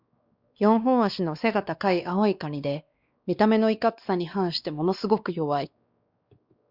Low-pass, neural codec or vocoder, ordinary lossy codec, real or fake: 5.4 kHz; codec, 16 kHz, 2 kbps, X-Codec, WavLM features, trained on Multilingual LibriSpeech; Opus, 64 kbps; fake